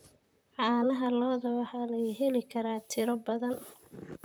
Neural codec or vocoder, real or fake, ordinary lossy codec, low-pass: vocoder, 44.1 kHz, 128 mel bands, Pupu-Vocoder; fake; none; none